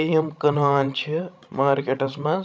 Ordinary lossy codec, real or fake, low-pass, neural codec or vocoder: none; fake; none; codec, 16 kHz, 16 kbps, FreqCodec, larger model